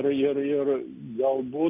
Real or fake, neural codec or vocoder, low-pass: real; none; 3.6 kHz